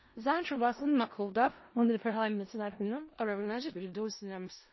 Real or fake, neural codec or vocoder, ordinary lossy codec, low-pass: fake; codec, 16 kHz in and 24 kHz out, 0.4 kbps, LongCat-Audio-Codec, four codebook decoder; MP3, 24 kbps; 7.2 kHz